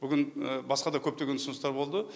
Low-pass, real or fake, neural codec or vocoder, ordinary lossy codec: none; real; none; none